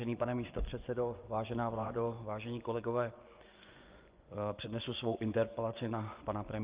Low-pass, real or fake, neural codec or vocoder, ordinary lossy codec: 3.6 kHz; fake; vocoder, 24 kHz, 100 mel bands, Vocos; Opus, 32 kbps